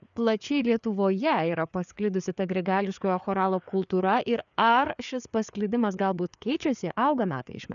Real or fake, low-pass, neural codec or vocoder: fake; 7.2 kHz; codec, 16 kHz, 4 kbps, FreqCodec, larger model